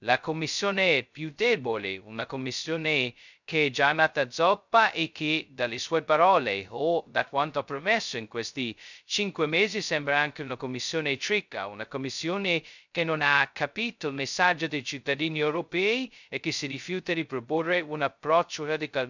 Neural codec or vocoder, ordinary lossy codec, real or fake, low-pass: codec, 16 kHz, 0.2 kbps, FocalCodec; none; fake; 7.2 kHz